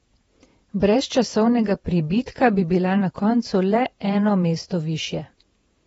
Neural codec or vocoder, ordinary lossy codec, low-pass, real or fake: vocoder, 48 kHz, 128 mel bands, Vocos; AAC, 24 kbps; 19.8 kHz; fake